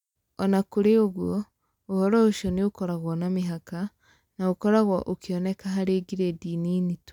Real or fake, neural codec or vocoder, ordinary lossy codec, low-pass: real; none; none; 19.8 kHz